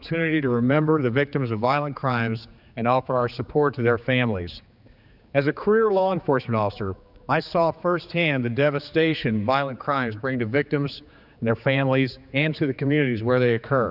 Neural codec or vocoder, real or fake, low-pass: codec, 16 kHz, 4 kbps, X-Codec, HuBERT features, trained on general audio; fake; 5.4 kHz